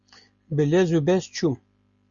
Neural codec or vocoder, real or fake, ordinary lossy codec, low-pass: none; real; Opus, 64 kbps; 7.2 kHz